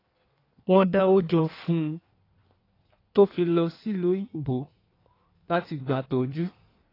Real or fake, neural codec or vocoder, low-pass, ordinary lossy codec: fake; codec, 16 kHz in and 24 kHz out, 1.1 kbps, FireRedTTS-2 codec; 5.4 kHz; AAC, 24 kbps